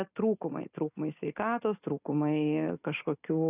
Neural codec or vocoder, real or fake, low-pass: none; real; 3.6 kHz